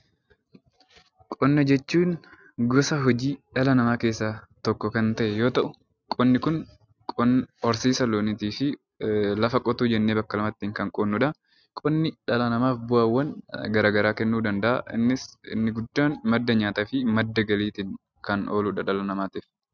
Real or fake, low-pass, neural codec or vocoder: real; 7.2 kHz; none